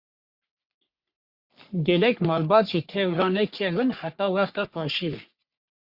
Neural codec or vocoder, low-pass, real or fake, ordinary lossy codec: codec, 44.1 kHz, 3.4 kbps, Pupu-Codec; 5.4 kHz; fake; Opus, 64 kbps